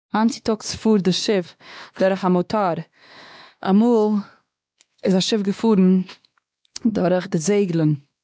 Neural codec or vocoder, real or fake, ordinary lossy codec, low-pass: codec, 16 kHz, 2 kbps, X-Codec, WavLM features, trained on Multilingual LibriSpeech; fake; none; none